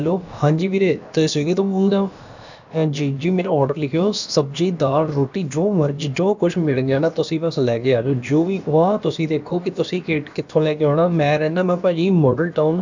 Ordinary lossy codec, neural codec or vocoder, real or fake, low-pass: none; codec, 16 kHz, about 1 kbps, DyCAST, with the encoder's durations; fake; 7.2 kHz